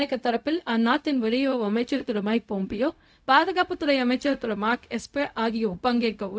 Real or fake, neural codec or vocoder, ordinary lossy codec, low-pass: fake; codec, 16 kHz, 0.4 kbps, LongCat-Audio-Codec; none; none